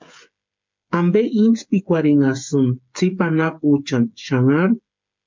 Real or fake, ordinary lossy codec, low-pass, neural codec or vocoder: fake; MP3, 64 kbps; 7.2 kHz; codec, 16 kHz, 8 kbps, FreqCodec, smaller model